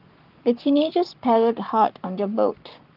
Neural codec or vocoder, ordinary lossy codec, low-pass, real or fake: codec, 44.1 kHz, 7.8 kbps, Pupu-Codec; Opus, 32 kbps; 5.4 kHz; fake